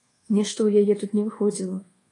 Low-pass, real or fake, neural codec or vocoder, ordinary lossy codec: 10.8 kHz; fake; codec, 24 kHz, 1.2 kbps, DualCodec; AAC, 32 kbps